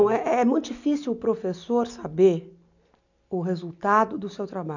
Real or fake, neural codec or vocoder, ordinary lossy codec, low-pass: real; none; none; 7.2 kHz